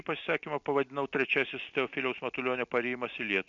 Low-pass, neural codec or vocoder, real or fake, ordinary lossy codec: 7.2 kHz; none; real; MP3, 64 kbps